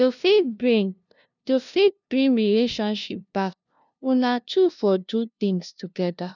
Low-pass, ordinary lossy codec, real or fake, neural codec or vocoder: 7.2 kHz; none; fake; codec, 16 kHz, 0.5 kbps, FunCodec, trained on LibriTTS, 25 frames a second